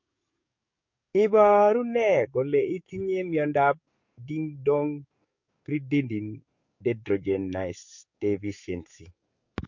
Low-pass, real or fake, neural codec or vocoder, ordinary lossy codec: 7.2 kHz; fake; codec, 44.1 kHz, 7.8 kbps, DAC; MP3, 48 kbps